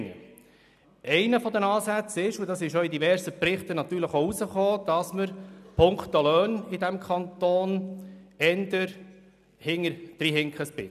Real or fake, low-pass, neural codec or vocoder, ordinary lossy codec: real; 14.4 kHz; none; none